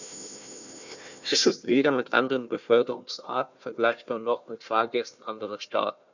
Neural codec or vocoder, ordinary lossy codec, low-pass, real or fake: codec, 16 kHz, 1 kbps, FunCodec, trained on Chinese and English, 50 frames a second; none; 7.2 kHz; fake